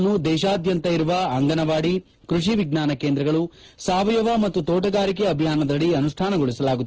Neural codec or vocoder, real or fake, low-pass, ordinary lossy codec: none; real; 7.2 kHz; Opus, 16 kbps